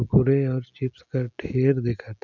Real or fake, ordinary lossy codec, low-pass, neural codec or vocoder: real; none; 7.2 kHz; none